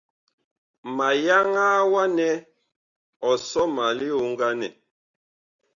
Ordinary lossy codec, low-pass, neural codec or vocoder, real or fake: Opus, 64 kbps; 7.2 kHz; none; real